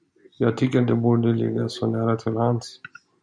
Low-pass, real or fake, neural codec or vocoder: 9.9 kHz; real; none